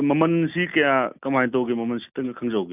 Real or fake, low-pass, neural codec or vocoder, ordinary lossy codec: real; 3.6 kHz; none; none